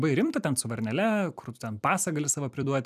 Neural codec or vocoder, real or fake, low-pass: vocoder, 44.1 kHz, 128 mel bands every 256 samples, BigVGAN v2; fake; 14.4 kHz